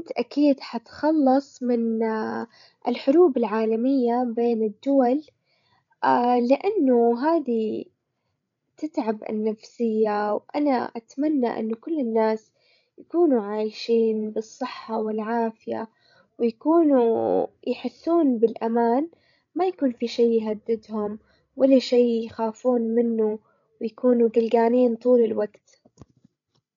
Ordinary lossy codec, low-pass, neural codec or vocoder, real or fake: none; 7.2 kHz; codec, 16 kHz, 16 kbps, FreqCodec, larger model; fake